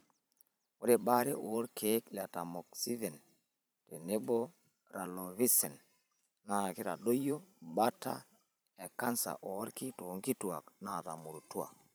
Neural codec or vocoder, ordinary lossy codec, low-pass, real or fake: vocoder, 44.1 kHz, 128 mel bands every 512 samples, BigVGAN v2; none; none; fake